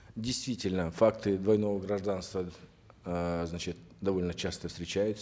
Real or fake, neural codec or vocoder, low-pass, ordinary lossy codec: real; none; none; none